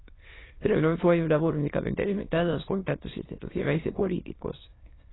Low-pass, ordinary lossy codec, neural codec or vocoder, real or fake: 7.2 kHz; AAC, 16 kbps; autoencoder, 22.05 kHz, a latent of 192 numbers a frame, VITS, trained on many speakers; fake